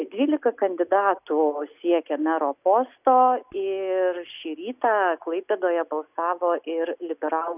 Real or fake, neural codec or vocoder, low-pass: real; none; 3.6 kHz